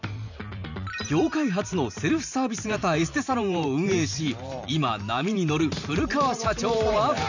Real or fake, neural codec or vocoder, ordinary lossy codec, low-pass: fake; vocoder, 44.1 kHz, 128 mel bands every 512 samples, BigVGAN v2; none; 7.2 kHz